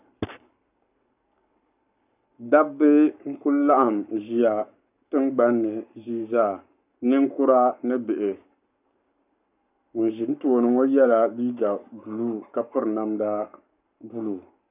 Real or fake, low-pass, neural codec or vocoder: fake; 3.6 kHz; codec, 44.1 kHz, 7.8 kbps, Pupu-Codec